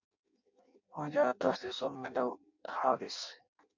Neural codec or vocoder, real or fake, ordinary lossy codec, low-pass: codec, 16 kHz in and 24 kHz out, 0.6 kbps, FireRedTTS-2 codec; fake; MP3, 64 kbps; 7.2 kHz